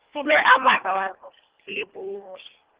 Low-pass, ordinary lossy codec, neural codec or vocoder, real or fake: 3.6 kHz; Opus, 16 kbps; codec, 24 kHz, 1.5 kbps, HILCodec; fake